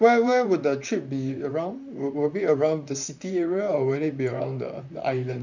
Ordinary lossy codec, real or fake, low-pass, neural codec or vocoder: none; fake; 7.2 kHz; vocoder, 44.1 kHz, 128 mel bands, Pupu-Vocoder